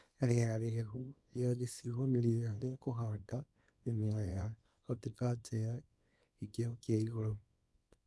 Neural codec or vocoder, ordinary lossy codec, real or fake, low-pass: codec, 24 kHz, 0.9 kbps, WavTokenizer, small release; none; fake; none